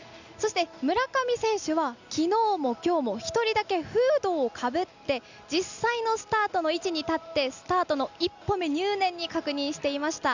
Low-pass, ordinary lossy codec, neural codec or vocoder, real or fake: 7.2 kHz; none; none; real